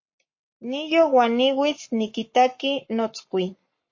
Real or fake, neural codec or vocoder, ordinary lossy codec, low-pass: fake; codec, 44.1 kHz, 7.8 kbps, Pupu-Codec; MP3, 32 kbps; 7.2 kHz